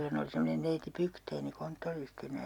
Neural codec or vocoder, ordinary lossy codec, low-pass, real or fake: none; none; 19.8 kHz; real